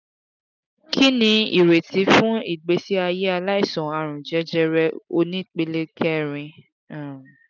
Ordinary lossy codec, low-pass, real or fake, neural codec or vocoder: none; 7.2 kHz; real; none